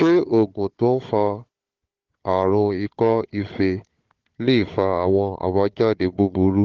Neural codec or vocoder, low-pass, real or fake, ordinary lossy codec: codec, 16 kHz, 4 kbps, FunCodec, trained on Chinese and English, 50 frames a second; 7.2 kHz; fake; Opus, 16 kbps